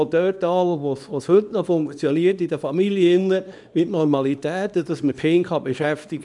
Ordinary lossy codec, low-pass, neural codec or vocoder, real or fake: none; 10.8 kHz; codec, 24 kHz, 0.9 kbps, WavTokenizer, small release; fake